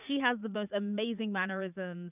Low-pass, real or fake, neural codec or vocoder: 3.6 kHz; fake; vocoder, 44.1 kHz, 80 mel bands, Vocos